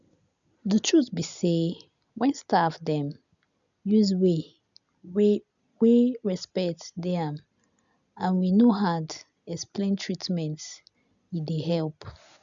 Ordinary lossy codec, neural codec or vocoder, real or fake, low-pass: none; none; real; 7.2 kHz